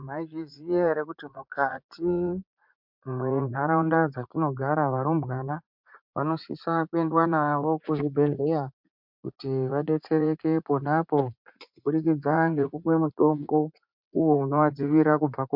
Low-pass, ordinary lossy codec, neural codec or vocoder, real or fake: 5.4 kHz; MP3, 48 kbps; vocoder, 44.1 kHz, 80 mel bands, Vocos; fake